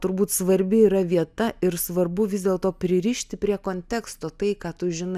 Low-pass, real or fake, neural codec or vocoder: 14.4 kHz; real; none